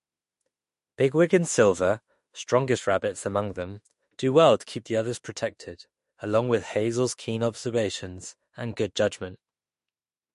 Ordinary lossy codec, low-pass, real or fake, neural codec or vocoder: MP3, 48 kbps; 14.4 kHz; fake; autoencoder, 48 kHz, 32 numbers a frame, DAC-VAE, trained on Japanese speech